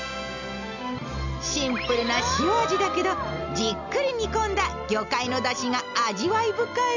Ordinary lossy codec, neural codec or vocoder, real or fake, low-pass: none; none; real; 7.2 kHz